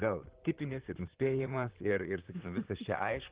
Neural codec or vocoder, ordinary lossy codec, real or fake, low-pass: vocoder, 44.1 kHz, 128 mel bands, Pupu-Vocoder; Opus, 16 kbps; fake; 3.6 kHz